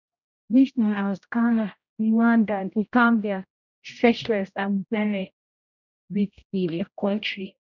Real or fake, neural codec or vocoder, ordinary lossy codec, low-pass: fake; codec, 16 kHz, 0.5 kbps, X-Codec, HuBERT features, trained on general audio; none; 7.2 kHz